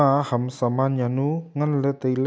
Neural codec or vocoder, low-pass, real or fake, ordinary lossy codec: none; none; real; none